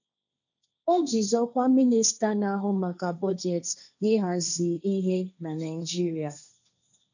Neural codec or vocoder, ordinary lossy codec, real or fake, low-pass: codec, 16 kHz, 1.1 kbps, Voila-Tokenizer; none; fake; 7.2 kHz